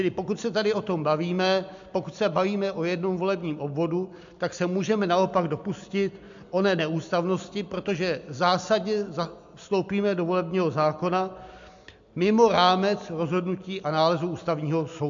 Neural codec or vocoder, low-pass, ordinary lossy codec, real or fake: none; 7.2 kHz; AAC, 64 kbps; real